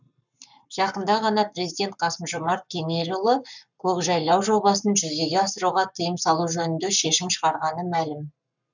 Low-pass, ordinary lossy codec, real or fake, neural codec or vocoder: 7.2 kHz; none; fake; codec, 44.1 kHz, 7.8 kbps, Pupu-Codec